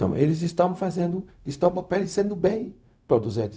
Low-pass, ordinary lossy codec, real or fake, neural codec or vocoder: none; none; fake; codec, 16 kHz, 0.4 kbps, LongCat-Audio-Codec